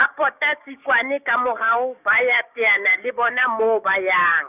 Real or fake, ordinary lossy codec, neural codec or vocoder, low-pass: fake; none; codec, 16 kHz, 6 kbps, DAC; 3.6 kHz